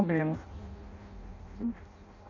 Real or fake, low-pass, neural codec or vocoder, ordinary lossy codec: fake; 7.2 kHz; codec, 16 kHz in and 24 kHz out, 0.6 kbps, FireRedTTS-2 codec; none